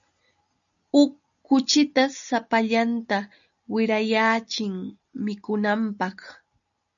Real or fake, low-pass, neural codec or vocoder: real; 7.2 kHz; none